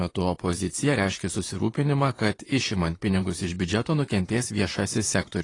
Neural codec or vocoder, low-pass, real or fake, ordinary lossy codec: vocoder, 44.1 kHz, 128 mel bands, Pupu-Vocoder; 10.8 kHz; fake; AAC, 32 kbps